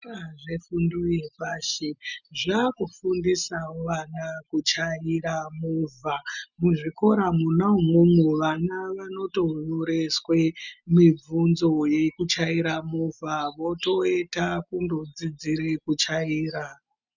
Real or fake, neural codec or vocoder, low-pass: real; none; 7.2 kHz